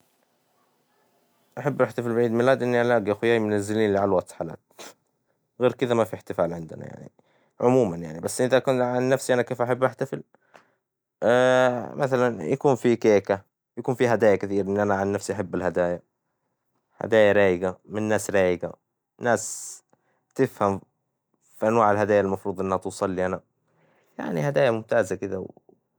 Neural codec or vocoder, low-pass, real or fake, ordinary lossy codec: none; none; real; none